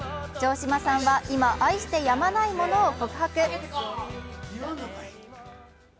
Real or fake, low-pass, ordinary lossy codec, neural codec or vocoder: real; none; none; none